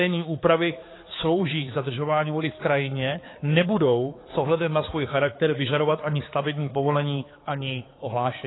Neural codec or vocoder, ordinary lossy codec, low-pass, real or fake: codec, 16 kHz, 4 kbps, X-Codec, HuBERT features, trained on balanced general audio; AAC, 16 kbps; 7.2 kHz; fake